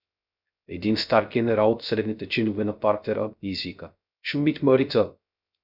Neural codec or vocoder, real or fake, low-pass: codec, 16 kHz, 0.3 kbps, FocalCodec; fake; 5.4 kHz